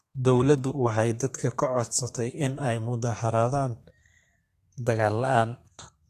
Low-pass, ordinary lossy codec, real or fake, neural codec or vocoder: 14.4 kHz; AAC, 64 kbps; fake; codec, 32 kHz, 1.9 kbps, SNAC